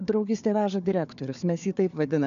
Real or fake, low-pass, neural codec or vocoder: fake; 7.2 kHz; codec, 16 kHz, 4 kbps, FunCodec, trained on Chinese and English, 50 frames a second